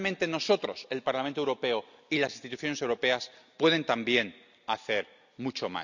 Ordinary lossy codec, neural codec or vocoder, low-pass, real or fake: none; none; 7.2 kHz; real